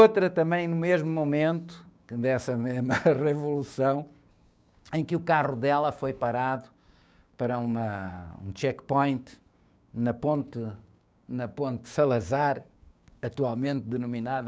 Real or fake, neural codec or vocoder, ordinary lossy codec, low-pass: fake; codec, 16 kHz, 6 kbps, DAC; none; none